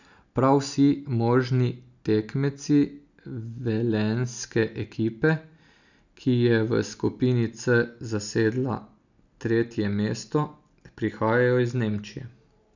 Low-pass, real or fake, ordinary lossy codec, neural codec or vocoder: 7.2 kHz; real; none; none